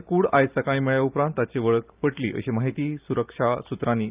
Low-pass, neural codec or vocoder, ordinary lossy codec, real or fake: 3.6 kHz; none; Opus, 24 kbps; real